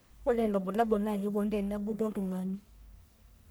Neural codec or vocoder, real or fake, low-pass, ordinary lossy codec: codec, 44.1 kHz, 1.7 kbps, Pupu-Codec; fake; none; none